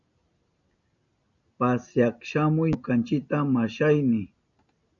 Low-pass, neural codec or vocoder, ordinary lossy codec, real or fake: 7.2 kHz; none; MP3, 96 kbps; real